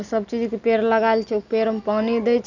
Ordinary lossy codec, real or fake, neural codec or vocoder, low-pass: none; real; none; 7.2 kHz